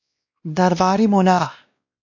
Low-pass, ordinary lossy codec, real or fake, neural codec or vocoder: 7.2 kHz; AAC, 48 kbps; fake; codec, 16 kHz, 1 kbps, X-Codec, WavLM features, trained on Multilingual LibriSpeech